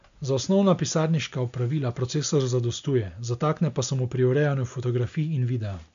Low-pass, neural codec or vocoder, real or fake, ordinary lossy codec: 7.2 kHz; none; real; MP3, 64 kbps